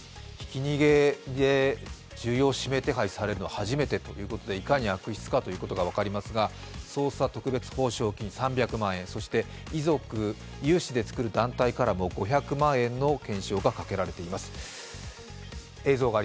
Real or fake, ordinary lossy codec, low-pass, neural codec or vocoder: real; none; none; none